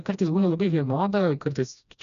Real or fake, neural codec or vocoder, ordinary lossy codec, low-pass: fake; codec, 16 kHz, 1 kbps, FreqCodec, smaller model; MP3, 96 kbps; 7.2 kHz